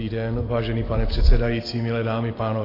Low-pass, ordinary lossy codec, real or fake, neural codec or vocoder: 5.4 kHz; AAC, 24 kbps; real; none